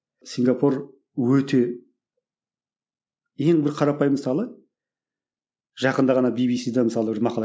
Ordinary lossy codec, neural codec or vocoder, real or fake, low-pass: none; none; real; none